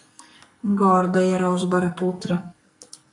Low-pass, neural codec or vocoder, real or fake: 10.8 kHz; codec, 44.1 kHz, 2.6 kbps, SNAC; fake